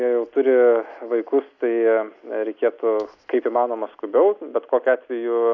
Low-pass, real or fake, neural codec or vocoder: 7.2 kHz; real; none